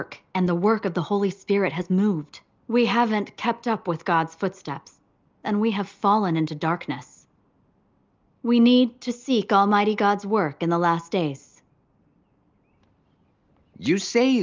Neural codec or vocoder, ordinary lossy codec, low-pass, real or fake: none; Opus, 24 kbps; 7.2 kHz; real